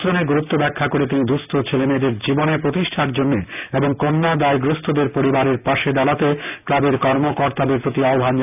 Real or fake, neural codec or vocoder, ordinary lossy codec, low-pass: real; none; none; 3.6 kHz